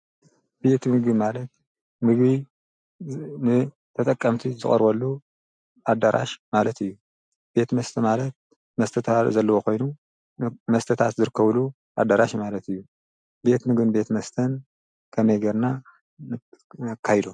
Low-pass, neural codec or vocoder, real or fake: 9.9 kHz; none; real